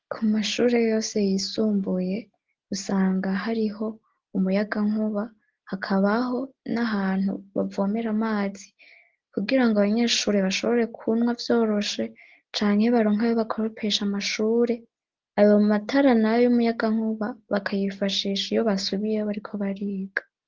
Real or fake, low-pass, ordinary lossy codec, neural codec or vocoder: real; 7.2 kHz; Opus, 16 kbps; none